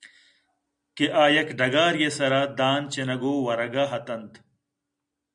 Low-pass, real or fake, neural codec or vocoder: 9.9 kHz; real; none